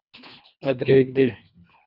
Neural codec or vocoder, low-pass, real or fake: codec, 24 kHz, 1.5 kbps, HILCodec; 5.4 kHz; fake